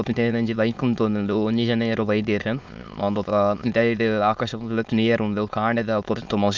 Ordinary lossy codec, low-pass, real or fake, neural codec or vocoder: Opus, 24 kbps; 7.2 kHz; fake; autoencoder, 22.05 kHz, a latent of 192 numbers a frame, VITS, trained on many speakers